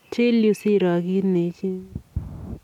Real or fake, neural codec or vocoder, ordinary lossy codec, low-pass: real; none; none; 19.8 kHz